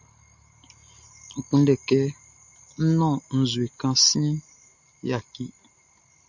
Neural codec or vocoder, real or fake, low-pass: none; real; 7.2 kHz